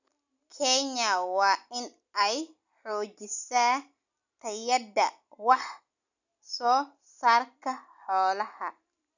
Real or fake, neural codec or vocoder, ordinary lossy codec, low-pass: real; none; none; 7.2 kHz